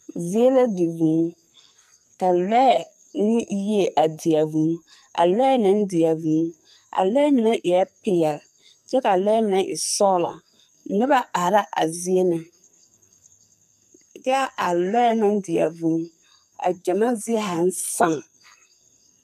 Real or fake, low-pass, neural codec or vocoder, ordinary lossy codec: fake; 14.4 kHz; codec, 44.1 kHz, 2.6 kbps, SNAC; MP3, 96 kbps